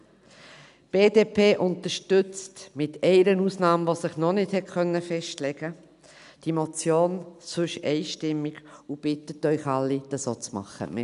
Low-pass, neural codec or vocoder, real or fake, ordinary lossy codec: 10.8 kHz; none; real; none